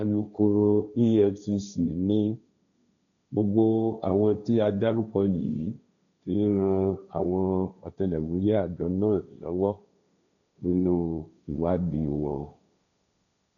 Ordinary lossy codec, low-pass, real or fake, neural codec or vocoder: none; 7.2 kHz; fake; codec, 16 kHz, 1.1 kbps, Voila-Tokenizer